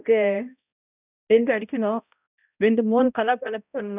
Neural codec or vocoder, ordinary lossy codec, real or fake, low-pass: codec, 16 kHz, 0.5 kbps, X-Codec, HuBERT features, trained on balanced general audio; none; fake; 3.6 kHz